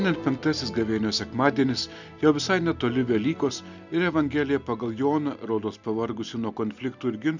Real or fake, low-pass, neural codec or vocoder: real; 7.2 kHz; none